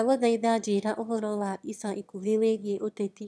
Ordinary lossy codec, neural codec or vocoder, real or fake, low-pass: none; autoencoder, 22.05 kHz, a latent of 192 numbers a frame, VITS, trained on one speaker; fake; none